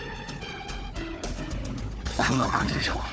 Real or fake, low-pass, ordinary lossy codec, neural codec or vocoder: fake; none; none; codec, 16 kHz, 4 kbps, FunCodec, trained on Chinese and English, 50 frames a second